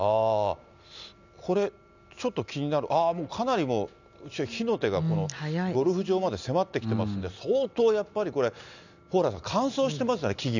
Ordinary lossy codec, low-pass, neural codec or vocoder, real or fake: none; 7.2 kHz; none; real